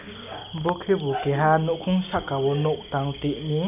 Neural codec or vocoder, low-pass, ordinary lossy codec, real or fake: none; 3.6 kHz; none; real